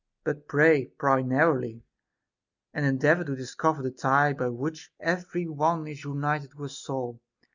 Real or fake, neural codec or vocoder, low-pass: real; none; 7.2 kHz